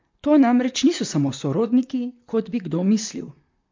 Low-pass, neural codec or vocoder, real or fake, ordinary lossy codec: 7.2 kHz; vocoder, 44.1 kHz, 128 mel bands, Pupu-Vocoder; fake; MP3, 48 kbps